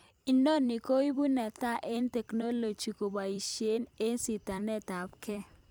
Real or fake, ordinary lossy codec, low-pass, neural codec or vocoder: fake; none; none; vocoder, 44.1 kHz, 128 mel bands every 256 samples, BigVGAN v2